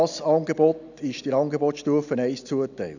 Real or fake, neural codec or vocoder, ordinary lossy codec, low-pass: real; none; none; 7.2 kHz